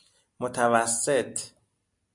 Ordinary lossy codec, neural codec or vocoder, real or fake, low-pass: MP3, 64 kbps; none; real; 10.8 kHz